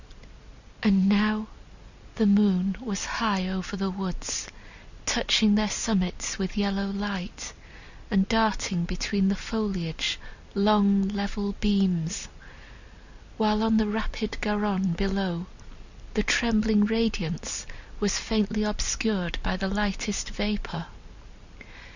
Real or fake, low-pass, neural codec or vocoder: real; 7.2 kHz; none